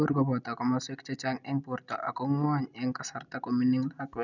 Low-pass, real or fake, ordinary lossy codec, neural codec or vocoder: 7.2 kHz; real; none; none